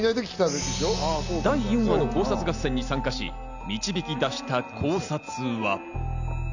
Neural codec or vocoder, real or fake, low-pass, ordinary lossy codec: none; real; 7.2 kHz; none